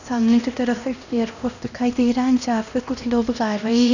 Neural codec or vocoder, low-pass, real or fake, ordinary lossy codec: codec, 16 kHz, 1 kbps, X-Codec, HuBERT features, trained on LibriSpeech; 7.2 kHz; fake; none